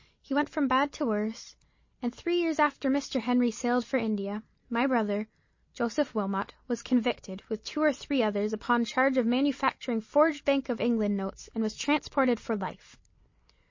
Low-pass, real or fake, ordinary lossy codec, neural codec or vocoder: 7.2 kHz; real; MP3, 32 kbps; none